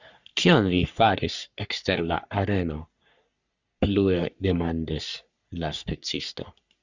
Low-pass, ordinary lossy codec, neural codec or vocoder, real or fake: 7.2 kHz; Opus, 64 kbps; codec, 44.1 kHz, 3.4 kbps, Pupu-Codec; fake